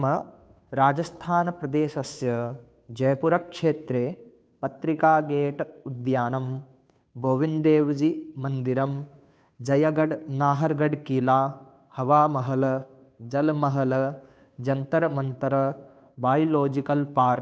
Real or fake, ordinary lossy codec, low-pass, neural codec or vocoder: fake; none; none; codec, 16 kHz, 2 kbps, FunCodec, trained on Chinese and English, 25 frames a second